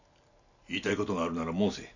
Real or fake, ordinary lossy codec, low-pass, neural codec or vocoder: real; none; 7.2 kHz; none